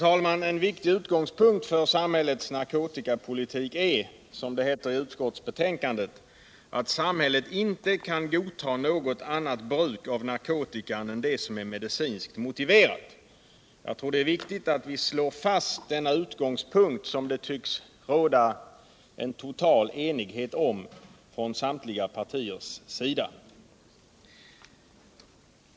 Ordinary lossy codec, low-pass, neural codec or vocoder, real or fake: none; none; none; real